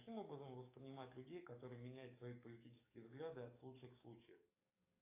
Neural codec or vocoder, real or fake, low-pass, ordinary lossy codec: codec, 44.1 kHz, 7.8 kbps, DAC; fake; 3.6 kHz; AAC, 32 kbps